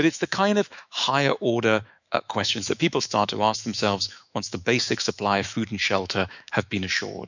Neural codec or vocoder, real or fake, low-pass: autoencoder, 48 kHz, 128 numbers a frame, DAC-VAE, trained on Japanese speech; fake; 7.2 kHz